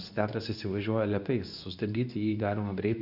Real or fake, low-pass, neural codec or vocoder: fake; 5.4 kHz; codec, 24 kHz, 0.9 kbps, WavTokenizer, medium speech release version 2